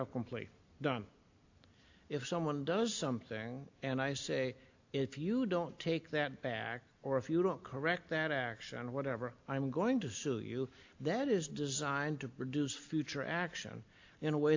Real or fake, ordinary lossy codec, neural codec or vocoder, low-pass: real; AAC, 48 kbps; none; 7.2 kHz